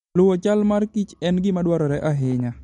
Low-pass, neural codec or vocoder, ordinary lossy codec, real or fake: 19.8 kHz; none; MP3, 48 kbps; real